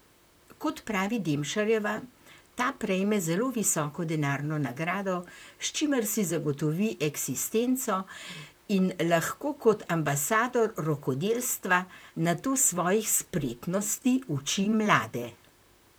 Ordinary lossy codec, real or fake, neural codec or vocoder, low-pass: none; fake; vocoder, 44.1 kHz, 128 mel bands, Pupu-Vocoder; none